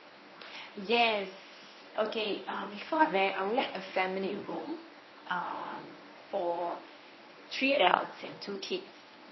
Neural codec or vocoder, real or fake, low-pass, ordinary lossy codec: codec, 24 kHz, 0.9 kbps, WavTokenizer, medium speech release version 1; fake; 7.2 kHz; MP3, 24 kbps